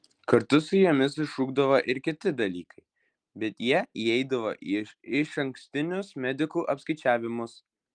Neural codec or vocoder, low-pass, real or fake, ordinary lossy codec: none; 9.9 kHz; real; Opus, 32 kbps